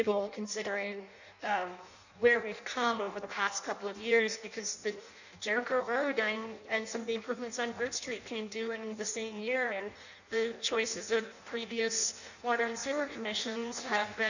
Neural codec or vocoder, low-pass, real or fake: codec, 16 kHz in and 24 kHz out, 0.6 kbps, FireRedTTS-2 codec; 7.2 kHz; fake